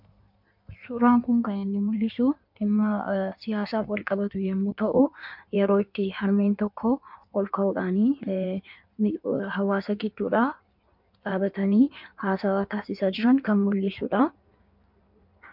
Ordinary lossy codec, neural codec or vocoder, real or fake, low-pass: MP3, 48 kbps; codec, 16 kHz in and 24 kHz out, 1.1 kbps, FireRedTTS-2 codec; fake; 5.4 kHz